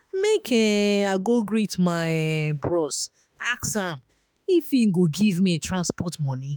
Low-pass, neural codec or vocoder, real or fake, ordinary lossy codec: none; autoencoder, 48 kHz, 32 numbers a frame, DAC-VAE, trained on Japanese speech; fake; none